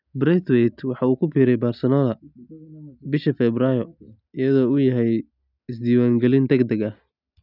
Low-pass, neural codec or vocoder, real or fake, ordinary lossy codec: 5.4 kHz; none; real; none